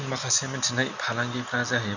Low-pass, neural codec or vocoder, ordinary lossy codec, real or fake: 7.2 kHz; none; none; real